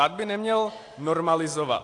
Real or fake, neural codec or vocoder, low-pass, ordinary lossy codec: real; none; 10.8 kHz; AAC, 48 kbps